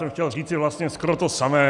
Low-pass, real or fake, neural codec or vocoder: 10.8 kHz; real; none